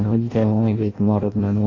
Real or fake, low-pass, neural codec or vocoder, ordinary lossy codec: fake; 7.2 kHz; codec, 16 kHz in and 24 kHz out, 0.6 kbps, FireRedTTS-2 codec; AAC, 32 kbps